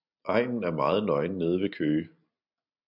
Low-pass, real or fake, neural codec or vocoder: 5.4 kHz; real; none